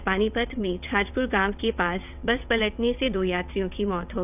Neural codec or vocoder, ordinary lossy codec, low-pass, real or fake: vocoder, 22.05 kHz, 80 mel bands, WaveNeXt; none; 3.6 kHz; fake